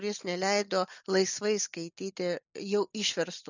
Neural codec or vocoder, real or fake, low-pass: none; real; 7.2 kHz